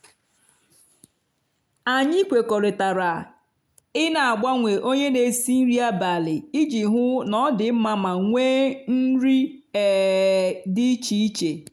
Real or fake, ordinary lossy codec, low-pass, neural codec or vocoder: real; none; 19.8 kHz; none